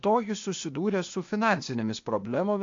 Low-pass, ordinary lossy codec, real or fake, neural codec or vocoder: 7.2 kHz; MP3, 48 kbps; fake; codec, 16 kHz, 0.8 kbps, ZipCodec